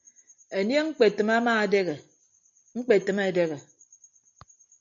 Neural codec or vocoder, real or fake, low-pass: none; real; 7.2 kHz